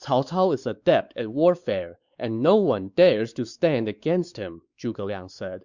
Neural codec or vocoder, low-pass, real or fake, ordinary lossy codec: codec, 16 kHz, 4 kbps, X-Codec, WavLM features, trained on Multilingual LibriSpeech; 7.2 kHz; fake; Opus, 64 kbps